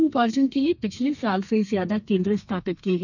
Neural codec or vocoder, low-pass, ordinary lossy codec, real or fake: codec, 32 kHz, 1.9 kbps, SNAC; 7.2 kHz; none; fake